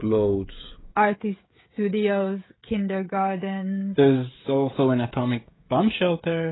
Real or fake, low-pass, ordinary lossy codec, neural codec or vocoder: fake; 7.2 kHz; AAC, 16 kbps; codec, 16 kHz, 16 kbps, FreqCodec, smaller model